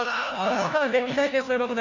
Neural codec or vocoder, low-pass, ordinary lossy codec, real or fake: codec, 16 kHz, 1 kbps, FunCodec, trained on LibriTTS, 50 frames a second; 7.2 kHz; none; fake